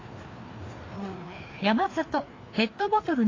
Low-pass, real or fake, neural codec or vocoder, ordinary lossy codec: 7.2 kHz; fake; codec, 16 kHz, 2 kbps, FreqCodec, larger model; AAC, 32 kbps